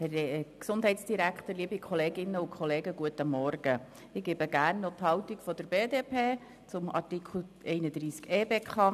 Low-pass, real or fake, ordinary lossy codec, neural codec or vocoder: 14.4 kHz; real; none; none